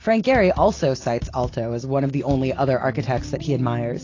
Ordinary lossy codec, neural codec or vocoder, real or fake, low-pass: AAC, 32 kbps; none; real; 7.2 kHz